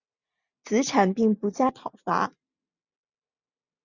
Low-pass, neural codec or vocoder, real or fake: 7.2 kHz; none; real